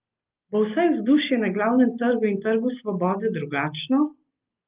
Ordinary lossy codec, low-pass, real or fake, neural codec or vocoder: Opus, 32 kbps; 3.6 kHz; real; none